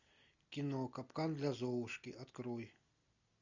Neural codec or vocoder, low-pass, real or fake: none; 7.2 kHz; real